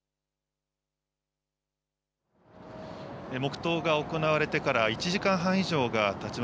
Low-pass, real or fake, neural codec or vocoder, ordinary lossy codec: none; real; none; none